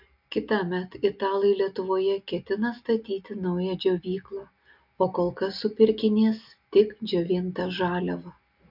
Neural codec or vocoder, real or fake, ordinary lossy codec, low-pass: none; real; MP3, 48 kbps; 5.4 kHz